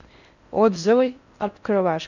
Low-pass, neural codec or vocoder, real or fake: 7.2 kHz; codec, 16 kHz in and 24 kHz out, 0.6 kbps, FocalCodec, streaming, 4096 codes; fake